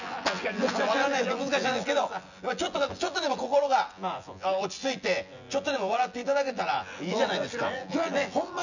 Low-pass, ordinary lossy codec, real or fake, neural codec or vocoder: 7.2 kHz; none; fake; vocoder, 24 kHz, 100 mel bands, Vocos